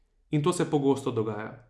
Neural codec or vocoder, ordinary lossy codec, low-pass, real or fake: none; none; none; real